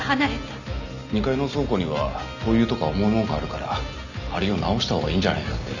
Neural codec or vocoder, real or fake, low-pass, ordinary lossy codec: none; real; 7.2 kHz; none